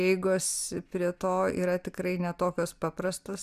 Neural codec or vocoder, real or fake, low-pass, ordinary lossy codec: none; real; 14.4 kHz; Opus, 64 kbps